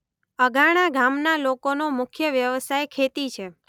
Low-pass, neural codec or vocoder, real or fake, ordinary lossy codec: 19.8 kHz; none; real; none